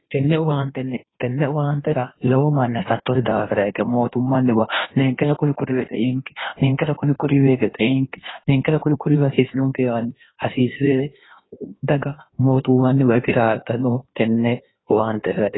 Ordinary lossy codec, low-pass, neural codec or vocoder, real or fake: AAC, 16 kbps; 7.2 kHz; codec, 16 kHz in and 24 kHz out, 1.1 kbps, FireRedTTS-2 codec; fake